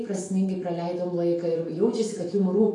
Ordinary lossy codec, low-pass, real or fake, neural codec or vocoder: AAC, 32 kbps; 10.8 kHz; fake; autoencoder, 48 kHz, 128 numbers a frame, DAC-VAE, trained on Japanese speech